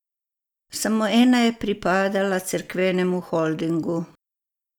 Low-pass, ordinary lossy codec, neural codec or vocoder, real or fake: 19.8 kHz; none; none; real